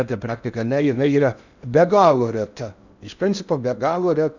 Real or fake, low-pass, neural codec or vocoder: fake; 7.2 kHz; codec, 16 kHz in and 24 kHz out, 0.6 kbps, FocalCodec, streaming, 4096 codes